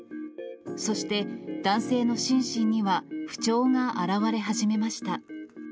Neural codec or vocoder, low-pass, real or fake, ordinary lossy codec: none; none; real; none